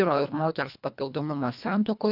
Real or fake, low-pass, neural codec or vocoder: fake; 5.4 kHz; codec, 24 kHz, 1.5 kbps, HILCodec